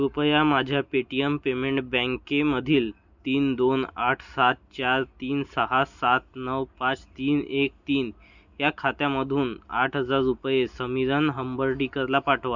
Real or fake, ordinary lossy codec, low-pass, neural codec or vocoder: real; none; 7.2 kHz; none